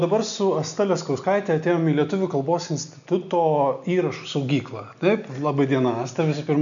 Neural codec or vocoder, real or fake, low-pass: none; real; 7.2 kHz